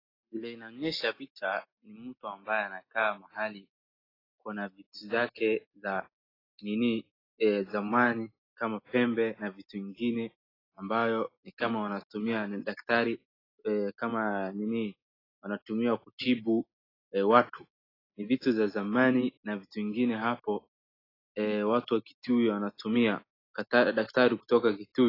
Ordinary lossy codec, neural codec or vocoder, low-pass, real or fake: AAC, 24 kbps; none; 5.4 kHz; real